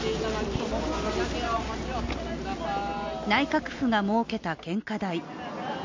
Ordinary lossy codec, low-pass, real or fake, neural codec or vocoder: MP3, 48 kbps; 7.2 kHz; real; none